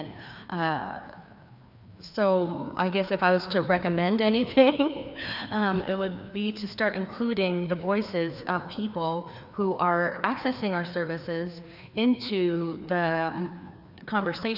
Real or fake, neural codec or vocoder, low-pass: fake; codec, 16 kHz, 2 kbps, FreqCodec, larger model; 5.4 kHz